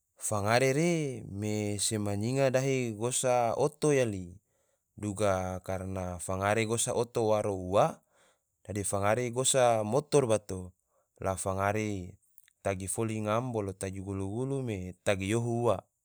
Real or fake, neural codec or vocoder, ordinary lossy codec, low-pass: real; none; none; none